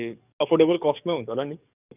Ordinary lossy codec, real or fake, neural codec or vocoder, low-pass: none; fake; codec, 44.1 kHz, 7.8 kbps, DAC; 3.6 kHz